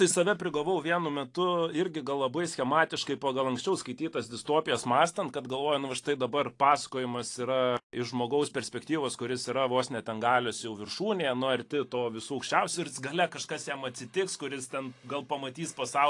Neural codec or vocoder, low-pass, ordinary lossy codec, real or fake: none; 10.8 kHz; AAC, 48 kbps; real